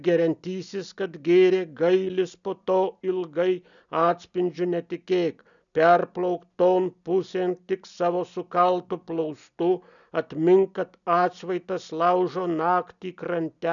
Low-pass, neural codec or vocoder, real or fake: 7.2 kHz; none; real